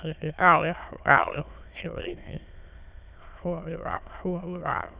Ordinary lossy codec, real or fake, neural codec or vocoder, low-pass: Opus, 64 kbps; fake; autoencoder, 22.05 kHz, a latent of 192 numbers a frame, VITS, trained on many speakers; 3.6 kHz